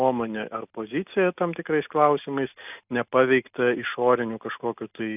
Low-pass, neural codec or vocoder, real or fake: 3.6 kHz; none; real